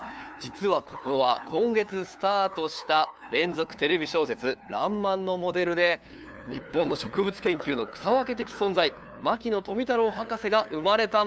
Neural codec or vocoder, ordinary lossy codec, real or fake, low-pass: codec, 16 kHz, 2 kbps, FunCodec, trained on LibriTTS, 25 frames a second; none; fake; none